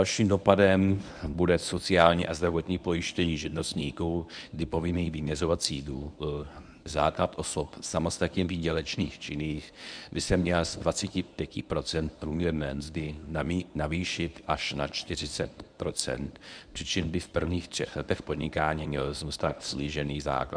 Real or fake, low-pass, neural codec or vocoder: fake; 9.9 kHz; codec, 24 kHz, 0.9 kbps, WavTokenizer, medium speech release version 1